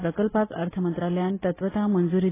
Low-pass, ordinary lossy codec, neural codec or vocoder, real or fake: 3.6 kHz; AAC, 16 kbps; none; real